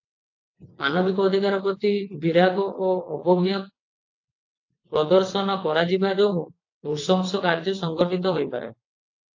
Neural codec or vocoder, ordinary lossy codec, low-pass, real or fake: vocoder, 22.05 kHz, 80 mel bands, WaveNeXt; AAC, 48 kbps; 7.2 kHz; fake